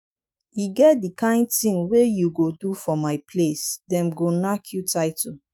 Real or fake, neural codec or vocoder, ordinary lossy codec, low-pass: fake; autoencoder, 48 kHz, 128 numbers a frame, DAC-VAE, trained on Japanese speech; none; none